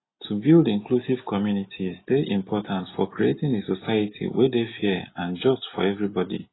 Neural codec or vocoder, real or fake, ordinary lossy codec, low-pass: none; real; AAC, 16 kbps; 7.2 kHz